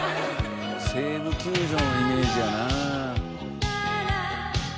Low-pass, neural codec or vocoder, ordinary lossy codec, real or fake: none; none; none; real